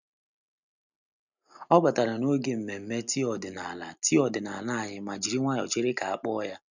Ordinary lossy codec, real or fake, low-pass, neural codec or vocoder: none; real; 7.2 kHz; none